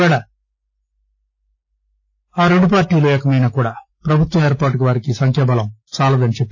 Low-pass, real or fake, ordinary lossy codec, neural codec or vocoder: 7.2 kHz; real; AAC, 48 kbps; none